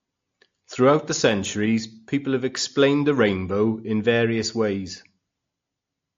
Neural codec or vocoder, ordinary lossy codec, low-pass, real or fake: none; AAC, 48 kbps; 7.2 kHz; real